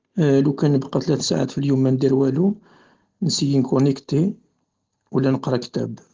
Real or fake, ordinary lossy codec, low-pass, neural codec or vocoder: real; Opus, 16 kbps; 7.2 kHz; none